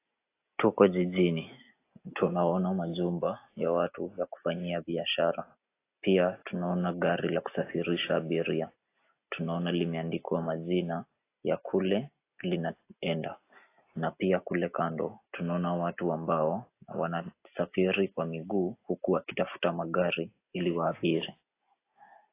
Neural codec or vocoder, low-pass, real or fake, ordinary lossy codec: none; 3.6 kHz; real; AAC, 24 kbps